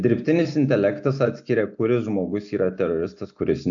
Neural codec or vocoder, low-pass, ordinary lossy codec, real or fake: none; 7.2 kHz; AAC, 64 kbps; real